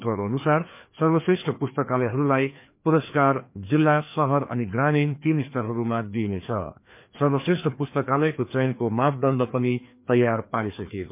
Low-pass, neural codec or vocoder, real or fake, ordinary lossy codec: 3.6 kHz; codec, 16 kHz, 2 kbps, FreqCodec, larger model; fake; MP3, 32 kbps